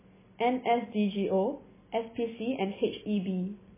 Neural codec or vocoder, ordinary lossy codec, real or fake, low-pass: none; MP3, 16 kbps; real; 3.6 kHz